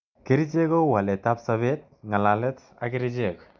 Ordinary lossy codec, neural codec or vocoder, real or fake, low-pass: none; none; real; 7.2 kHz